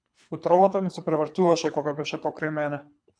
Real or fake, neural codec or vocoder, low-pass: fake; codec, 24 kHz, 3 kbps, HILCodec; 9.9 kHz